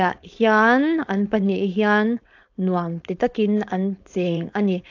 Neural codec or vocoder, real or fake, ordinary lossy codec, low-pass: codec, 16 kHz, 4.8 kbps, FACodec; fake; AAC, 48 kbps; 7.2 kHz